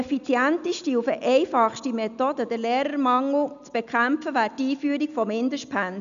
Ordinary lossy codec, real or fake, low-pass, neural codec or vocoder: none; real; 7.2 kHz; none